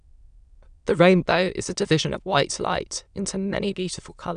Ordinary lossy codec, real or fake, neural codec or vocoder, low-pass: AAC, 96 kbps; fake; autoencoder, 22.05 kHz, a latent of 192 numbers a frame, VITS, trained on many speakers; 9.9 kHz